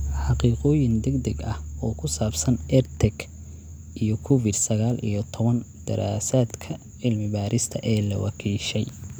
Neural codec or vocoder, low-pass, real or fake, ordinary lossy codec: none; none; real; none